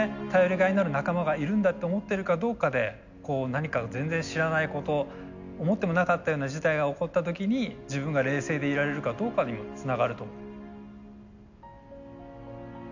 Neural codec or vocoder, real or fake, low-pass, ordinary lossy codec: none; real; 7.2 kHz; none